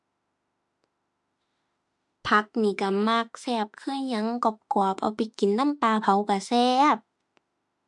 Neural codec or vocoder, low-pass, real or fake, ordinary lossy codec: autoencoder, 48 kHz, 32 numbers a frame, DAC-VAE, trained on Japanese speech; 10.8 kHz; fake; MP3, 64 kbps